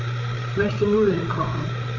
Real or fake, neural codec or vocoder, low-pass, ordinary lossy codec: fake; codec, 16 kHz, 8 kbps, FreqCodec, larger model; 7.2 kHz; MP3, 64 kbps